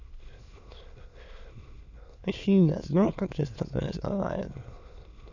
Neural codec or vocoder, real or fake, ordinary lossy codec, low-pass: autoencoder, 22.05 kHz, a latent of 192 numbers a frame, VITS, trained on many speakers; fake; none; 7.2 kHz